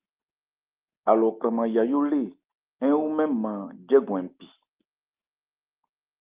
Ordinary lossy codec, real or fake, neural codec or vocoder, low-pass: Opus, 32 kbps; real; none; 3.6 kHz